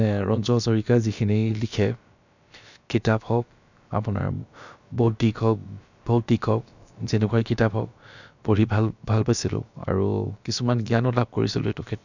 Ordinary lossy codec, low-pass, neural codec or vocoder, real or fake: none; 7.2 kHz; codec, 16 kHz, about 1 kbps, DyCAST, with the encoder's durations; fake